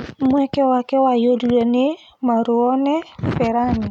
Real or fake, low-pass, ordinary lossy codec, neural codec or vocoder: real; 19.8 kHz; none; none